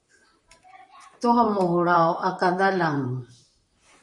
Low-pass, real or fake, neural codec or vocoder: 10.8 kHz; fake; vocoder, 44.1 kHz, 128 mel bands, Pupu-Vocoder